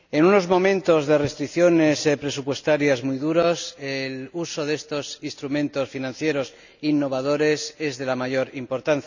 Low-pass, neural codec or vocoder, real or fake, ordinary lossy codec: 7.2 kHz; none; real; none